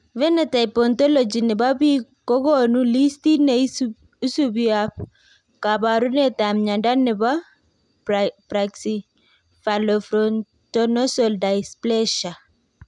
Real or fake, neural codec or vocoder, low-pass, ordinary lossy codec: real; none; 10.8 kHz; none